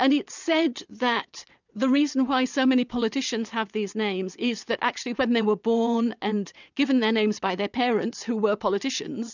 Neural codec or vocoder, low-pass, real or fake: vocoder, 22.05 kHz, 80 mel bands, WaveNeXt; 7.2 kHz; fake